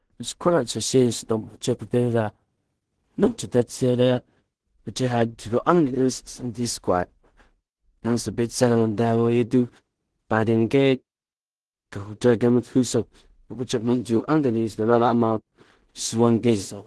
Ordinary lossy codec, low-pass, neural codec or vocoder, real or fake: Opus, 16 kbps; 10.8 kHz; codec, 16 kHz in and 24 kHz out, 0.4 kbps, LongCat-Audio-Codec, two codebook decoder; fake